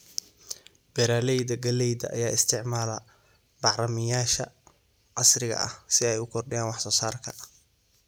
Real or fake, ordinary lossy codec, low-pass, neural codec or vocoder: real; none; none; none